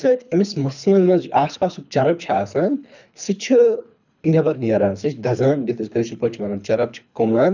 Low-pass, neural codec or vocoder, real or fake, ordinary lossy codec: 7.2 kHz; codec, 24 kHz, 3 kbps, HILCodec; fake; none